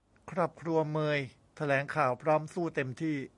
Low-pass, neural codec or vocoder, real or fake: 10.8 kHz; none; real